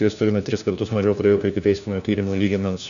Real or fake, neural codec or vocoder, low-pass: fake; codec, 16 kHz, 1 kbps, FunCodec, trained on LibriTTS, 50 frames a second; 7.2 kHz